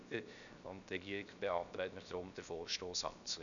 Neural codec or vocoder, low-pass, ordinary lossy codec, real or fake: codec, 16 kHz, 0.7 kbps, FocalCodec; 7.2 kHz; none; fake